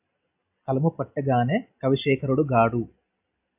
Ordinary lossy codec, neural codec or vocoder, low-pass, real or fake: AAC, 32 kbps; none; 3.6 kHz; real